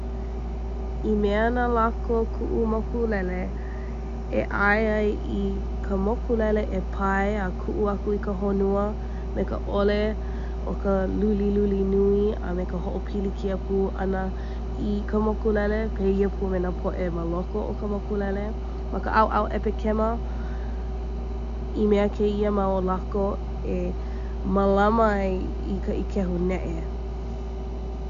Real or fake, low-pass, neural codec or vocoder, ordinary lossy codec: real; 7.2 kHz; none; none